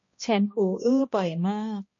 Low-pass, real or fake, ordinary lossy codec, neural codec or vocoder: 7.2 kHz; fake; MP3, 32 kbps; codec, 16 kHz, 1 kbps, X-Codec, HuBERT features, trained on balanced general audio